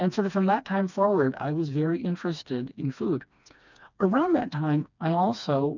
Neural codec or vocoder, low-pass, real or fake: codec, 16 kHz, 2 kbps, FreqCodec, smaller model; 7.2 kHz; fake